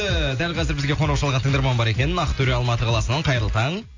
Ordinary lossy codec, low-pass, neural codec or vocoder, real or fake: AAC, 48 kbps; 7.2 kHz; none; real